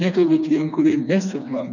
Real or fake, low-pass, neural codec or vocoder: fake; 7.2 kHz; codec, 16 kHz, 2 kbps, FreqCodec, smaller model